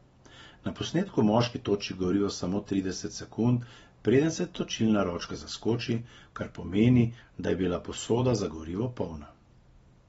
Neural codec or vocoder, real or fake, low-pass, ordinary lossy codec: none; real; 19.8 kHz; AAC, 24 kbps